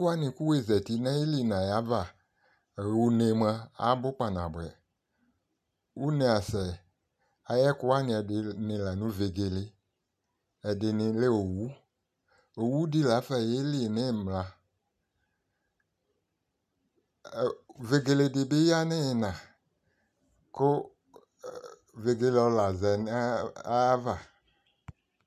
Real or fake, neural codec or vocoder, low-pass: real; none; 14.4 kHz